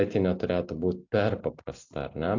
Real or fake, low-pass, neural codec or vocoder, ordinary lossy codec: real; 7.2 kHz; none; AAC, 48 kbps